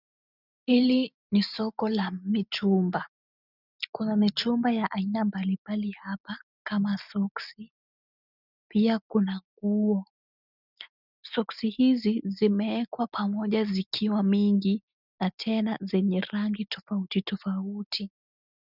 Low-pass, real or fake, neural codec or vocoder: 5.4 kHz; real; none